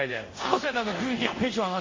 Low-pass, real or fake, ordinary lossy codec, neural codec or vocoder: 7.2 kHz; fake; MP3, 32 kbps; codec, 24 kHz, 0.5 kbps, DualCodec